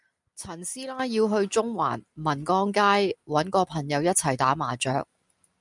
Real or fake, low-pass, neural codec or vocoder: real; 10.8 kHz; none